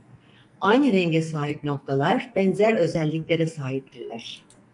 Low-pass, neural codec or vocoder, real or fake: 10.8 kHz; codec, 44.1 kHz, 2.6 kbps, SNAC; fake